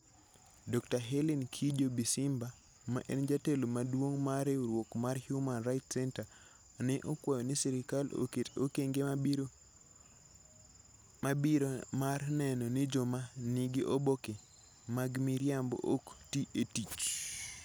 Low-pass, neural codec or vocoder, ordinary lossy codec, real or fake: none; none; none; real